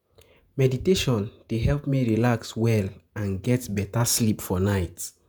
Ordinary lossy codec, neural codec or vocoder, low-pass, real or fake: none; vocoder, 48 kHz, 128 mel bands, Vocos; none; fake